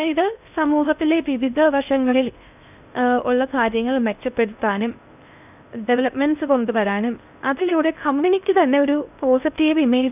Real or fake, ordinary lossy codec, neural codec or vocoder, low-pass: fake; none; codec, 16 kHz in and 24 kHz out, 0.6 kbps, FocalCodec, streaming, 4096 codes; 3.6 kHz